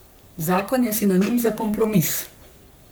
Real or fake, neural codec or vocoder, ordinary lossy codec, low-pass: fake; codec, 44.1 kHz, 3.4 kbps, Pupu-Codec; none; none